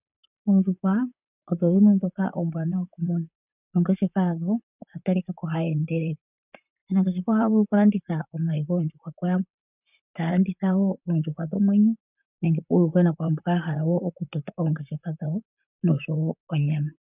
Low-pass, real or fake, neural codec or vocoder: 3.6 kHz; fake; vocoder, 44.1 kHz, 128 mel bands, Pupu-Vocoder